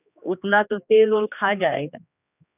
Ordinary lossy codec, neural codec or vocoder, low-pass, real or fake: none; codec, 16 kHz, 2 kbps, X-Codec, HuBERT features, trained on general audio; 3.6 kHz; fake